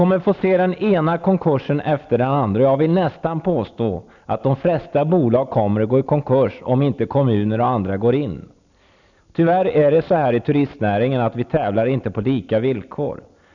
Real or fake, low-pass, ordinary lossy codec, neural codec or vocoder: real; 7.2 kHz; none; none